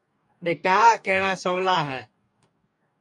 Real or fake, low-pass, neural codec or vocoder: fake; 10.8 kHz; codec, 44.1 kHz, 2.6 kbps, DAC